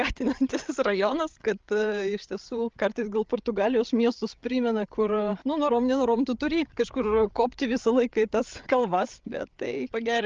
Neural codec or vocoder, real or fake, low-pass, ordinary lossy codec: codec, 16 kHz, 8 kbps, FreqCodec, larger model; fake; 7.2 kHz; Opus, 24 kbps